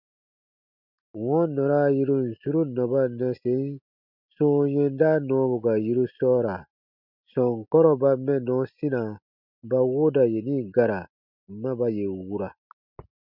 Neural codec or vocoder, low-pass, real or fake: none; 5.4 kHz; real